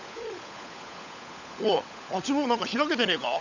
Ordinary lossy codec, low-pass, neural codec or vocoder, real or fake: none; 7.2 kHz; codec, 16 kHz, 16 kbps, FunCodec, trained on LibriTTS, 50 frames a second; fake